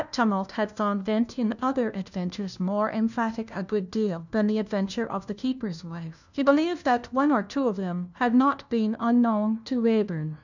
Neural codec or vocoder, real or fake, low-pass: codec, 16 kHz, 1 kbps, FunCodec, trained on LibriTTS, 50 frames a second; fake; 7.2 kHz